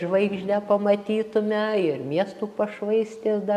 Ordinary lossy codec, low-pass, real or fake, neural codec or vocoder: AAC, 96 kbps; 14.4 kHz; real; none